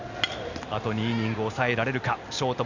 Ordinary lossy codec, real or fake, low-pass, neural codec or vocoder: Opus, 64 kbps; real; 7.2 kHz; none